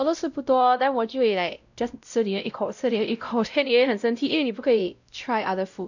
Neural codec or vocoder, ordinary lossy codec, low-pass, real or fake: codec, 16 kHz, 0.5 kbps, X-Codec, WavLM features, trained on Multilingual LibriSpeech; none; 7.2 kHz; fake